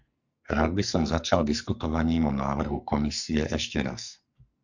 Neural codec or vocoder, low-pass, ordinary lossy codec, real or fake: codec, 44.1 kHz, 2.6 kbps, SNAC; 7.2 kHz; Opus, 64 kbps; fake